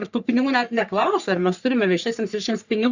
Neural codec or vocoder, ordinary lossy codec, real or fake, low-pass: codec, 44.1 kHz, 3.4 kbps, Pupu-Codec; Opus, 64 kbps; fake; 7.2 kHz